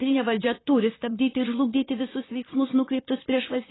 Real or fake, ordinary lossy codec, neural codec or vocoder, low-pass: fake; AAC, 16 kbps; vocoder, 44.1 kHz, 128 mel bands, Pupu-Vocoder; 7.2 kHz